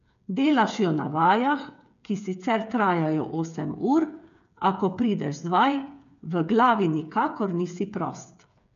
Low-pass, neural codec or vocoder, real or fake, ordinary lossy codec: 7.2 kHz; codec, 16 kHz, 8 kbps, FreqCodec, smaller model; fake; none